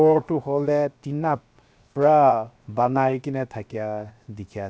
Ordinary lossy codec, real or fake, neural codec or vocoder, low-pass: none; fake; codec, 16 kHz, 0.7 kbps, FocalCodec; none